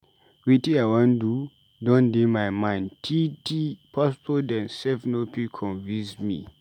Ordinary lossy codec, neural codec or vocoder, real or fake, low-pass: none; none; real; 19.8 kHz